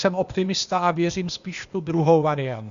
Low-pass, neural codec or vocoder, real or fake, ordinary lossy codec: 7.2 kHz; codec, 16 kHz, 0.8 kbps, ZipCodec; fake; Opus, 64 kbps